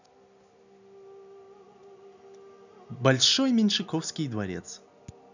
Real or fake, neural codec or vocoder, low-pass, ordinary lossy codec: real; none; 7.2 kHz; none